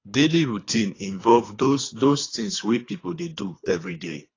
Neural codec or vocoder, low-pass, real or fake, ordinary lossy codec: codec, 24 kHz, 3 kbps, HILCodec; 7.2 kHz; fake; AAC, 32 kbps